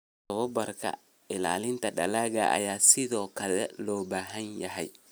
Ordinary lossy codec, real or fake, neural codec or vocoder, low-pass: none; real; none; none